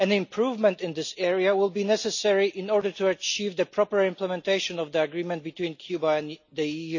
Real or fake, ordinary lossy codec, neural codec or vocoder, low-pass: real; none; none; 7.2 kHz